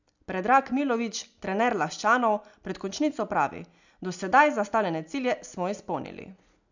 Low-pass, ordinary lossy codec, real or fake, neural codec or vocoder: 7.2 kHz; none; real; none